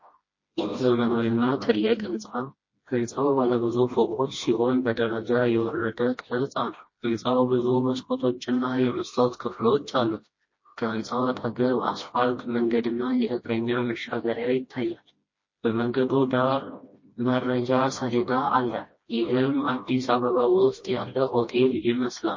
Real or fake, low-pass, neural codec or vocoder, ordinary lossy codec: fake; 7.2 kHz; codec, 16 kHz, 1 kbps, FreqCodec, smaller model; MP3, 32 kbps